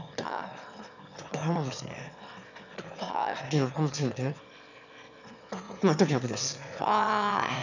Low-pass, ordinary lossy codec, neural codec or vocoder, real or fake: 7.2 kHz; none; autoencoder, 22.05 kHz, a latent of 192 numbers a frame, VITS, trained on one speaker; fake